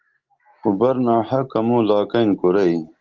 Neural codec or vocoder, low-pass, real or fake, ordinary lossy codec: none; 7.2 kHz; real; Opus, 16 kbps